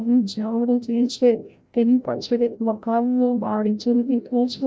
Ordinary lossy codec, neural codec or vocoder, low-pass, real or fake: none; codec, 16 kHz, 0.5 kbps, FreqCodec, larger model; none; fake